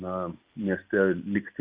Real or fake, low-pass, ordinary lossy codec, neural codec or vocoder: real; 3.6 kHz; Opus, 64 kbps; none